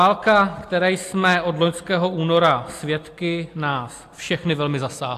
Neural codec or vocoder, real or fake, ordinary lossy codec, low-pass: none; real; AAC, 64 kbps; 14.4 kHz